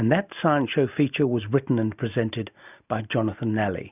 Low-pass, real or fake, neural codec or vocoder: 3.6 kHz; real; none